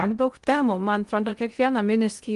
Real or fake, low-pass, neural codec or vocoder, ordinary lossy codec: fake; 10.8 kHz; codec, 16 kHz in and 24 kHz out, 0.6 kbps, FocalCodec, streaming, 2048 codes; Opus, 32 kbps